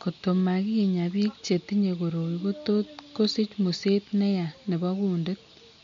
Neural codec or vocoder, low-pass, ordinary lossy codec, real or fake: none; 7.2 kHz; MP3, 48 kbps; real